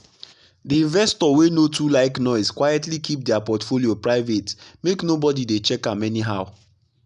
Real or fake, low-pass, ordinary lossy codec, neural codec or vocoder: real; 10.8 kHz; none; none